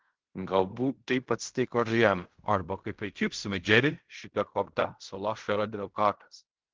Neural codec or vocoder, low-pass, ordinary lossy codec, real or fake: codec, 16 kHz in and 24 kHz out, 0.4 kbps, LongCat-Audio-Codec, fine tuned four codebook decoder; 7.2 kHz; Opus, 16 kbps; fake